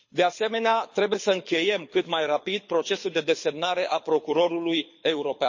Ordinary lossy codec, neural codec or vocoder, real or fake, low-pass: MP3, 32 kbps; codec, 24 kHz, 6 kbps, HILCodec; fake; 7.2 kHz